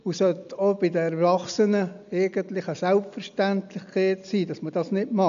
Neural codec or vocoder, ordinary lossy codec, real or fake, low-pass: none; AAC, 96 kbps; real; 7.2 kHz